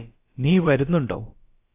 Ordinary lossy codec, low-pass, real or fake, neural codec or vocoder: AAC, 24 kbps; 3.6 kHz; fake; codec, 16 kHz, about 1 kbps, DyCAST, with the encoder's durations